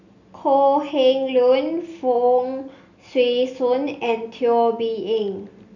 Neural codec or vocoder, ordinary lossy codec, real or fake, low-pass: none; none; real; 7.2 kHz